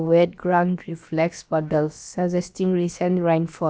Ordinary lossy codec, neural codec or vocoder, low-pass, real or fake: none; codec, 16 kHz, about 1 kbps, DyCAST, with the encoder's durations; none; fake